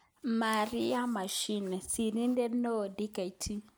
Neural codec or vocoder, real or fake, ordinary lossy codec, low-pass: none; real; none; none